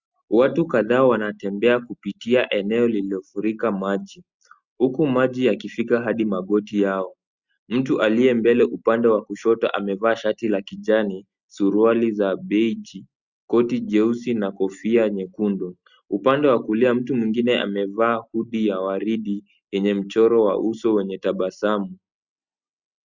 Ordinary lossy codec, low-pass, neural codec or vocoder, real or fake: Opus, 64 kbps; 7.2 kHz; none; real